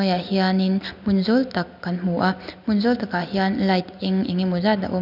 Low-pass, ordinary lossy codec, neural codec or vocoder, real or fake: 5.4 kHz; none; none; real